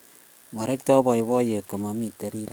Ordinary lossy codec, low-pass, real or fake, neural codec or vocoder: none; none; fake; codec, 44.1 kHz, 7.8 kbps, DAC